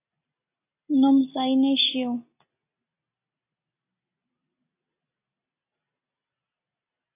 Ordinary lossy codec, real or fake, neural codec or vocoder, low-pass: AAC, 24 kbps; real; none; 3.6 kHz